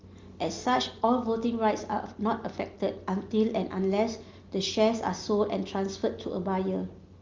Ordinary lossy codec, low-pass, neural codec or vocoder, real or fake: Opus, 32 kbps; 7.2 kHz; none; real